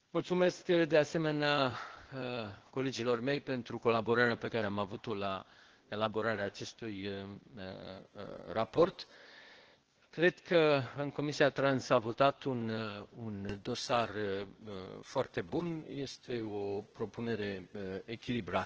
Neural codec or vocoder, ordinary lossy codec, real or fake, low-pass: codec, 16 kHz, 0.8 kbps, ZipCodec; Opus, 16 kbps; fake; 7.2 kHz